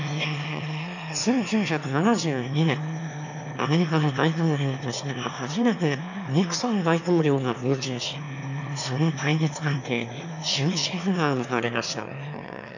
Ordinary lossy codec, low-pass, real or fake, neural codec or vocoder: none; 7.2 kHz; fake; autoencoder, 22.05 kHz, a latent of 192 numbers a frame, VITS, trained on one speaker